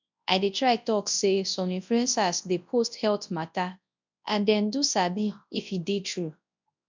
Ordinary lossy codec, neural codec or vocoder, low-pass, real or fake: MP3, 64 kbps; codec, 24 kHz, 0.9 kbps, WavTokenizer, large speech release; 7.2 kHz; fake